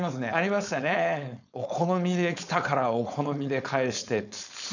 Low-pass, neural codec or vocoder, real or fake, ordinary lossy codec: 7.2 kHz; codec, 16 kHz, 4.8 kbps, FACodec; fake; none